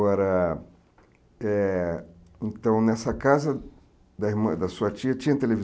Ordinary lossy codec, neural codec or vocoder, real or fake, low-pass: none; none; real; none